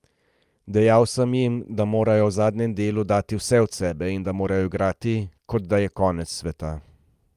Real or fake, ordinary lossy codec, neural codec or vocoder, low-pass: real; Opus, 32 kbps; none; 14.4 kHz